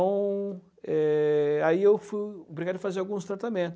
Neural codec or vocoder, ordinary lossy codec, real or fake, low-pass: none; none; real; none